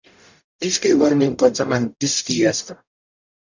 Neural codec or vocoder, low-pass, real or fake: codec, 44.1 kHz, 0.9 kbps, DAC; 7.2 kHz; fake